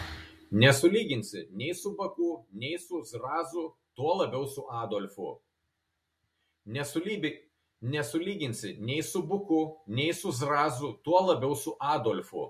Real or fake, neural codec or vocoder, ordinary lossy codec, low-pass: real; none; MP3, 64 kbps; 14.4 kHz